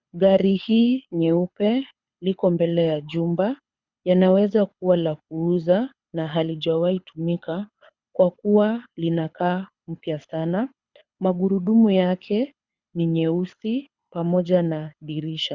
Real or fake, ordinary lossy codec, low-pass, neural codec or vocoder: fake; Opus, 64 kbps; 7.2 kHz; codec, 24 kHz, 6 kbps, HILCodec